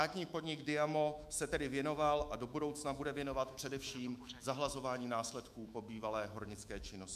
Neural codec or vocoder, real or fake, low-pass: autoencoder, 48 kHz, 128 numbers a frame, DAC-VAE, trained on Japanese speech; fake; 14.4 kHz